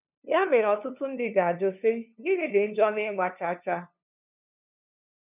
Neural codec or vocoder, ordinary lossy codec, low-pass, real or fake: codec, 16 kHz, 2 kbps, FunCodec, trained on LibriTTS, 25 frames a second; none; 3.6 kHz; fake